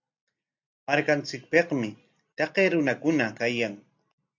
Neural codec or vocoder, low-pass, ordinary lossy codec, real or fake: none; 7.2 kHz; AAC, 48 kbps; real